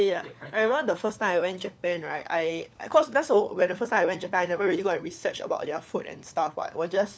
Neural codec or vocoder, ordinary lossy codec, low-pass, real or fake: codec, 16 kHz, 4 kbps, FunCodec, trained on LibriTTS, 50 frames a second; none; none; fake